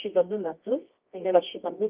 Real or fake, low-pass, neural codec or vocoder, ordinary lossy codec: fake; 3.6 kHz; codec, 24 kHz, 0.9 kbps, WavTokenizer, medium music audio release; Opus, 24 kbps